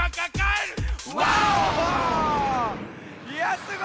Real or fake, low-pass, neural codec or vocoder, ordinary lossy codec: real; none; none; none